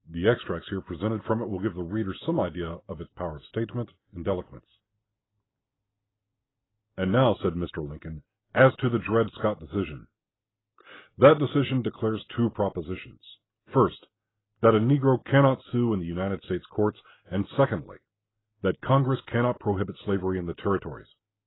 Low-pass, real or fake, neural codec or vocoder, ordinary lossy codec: 7.2 kHz; real; none; AAC, 16 kbps